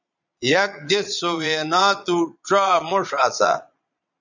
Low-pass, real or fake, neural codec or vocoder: 7.2 kHz; fake; vocoder, 22.05 kHz, 80 mel bands, Vocos